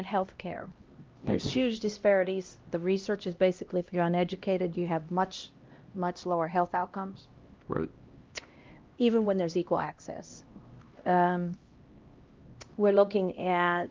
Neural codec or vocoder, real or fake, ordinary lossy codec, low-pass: codec, 16 kHz, 1 kbps, X-Codec, HuBERT features, trained on LibriSpeech; fake; Opus, 32 kbps; 7.2 kHz